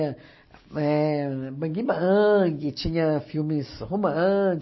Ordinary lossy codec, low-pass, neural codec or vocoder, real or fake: MP3, 24 kbps; 7.2 kHz; none; real